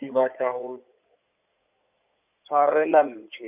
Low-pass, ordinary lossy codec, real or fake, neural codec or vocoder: 3.6 kHz; none; fake; codec, 16 kHz in and 24 kHz out, 2.2 kbps, FireRedTTS-2 codec